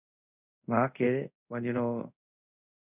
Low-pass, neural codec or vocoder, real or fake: 3.6 kHz; codec, 24 kHz, 0.5 kbps, DualCodec; fake